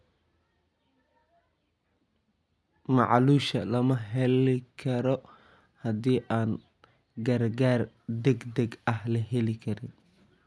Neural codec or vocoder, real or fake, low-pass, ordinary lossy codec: none; real; none; none